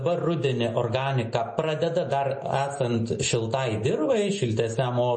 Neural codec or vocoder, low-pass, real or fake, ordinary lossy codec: none; 10.8 kHz; real; MP3, 32 kbps